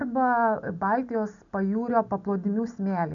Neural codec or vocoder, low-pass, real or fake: none; 7.2 kHz; real